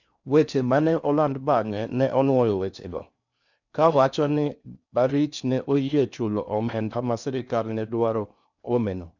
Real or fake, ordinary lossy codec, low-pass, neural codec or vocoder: fake; none; 7.2 kHz; codec, 16 kHz in and 24 kHz out, 0.6 kbps, FocalCodec, streaming, 4096 codes